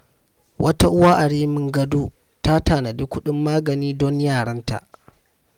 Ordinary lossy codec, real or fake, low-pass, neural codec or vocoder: Opus, 32 kbps; real; 19.8 kHz; none